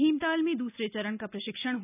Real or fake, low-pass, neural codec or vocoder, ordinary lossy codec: real; 3.6 kHz; none; none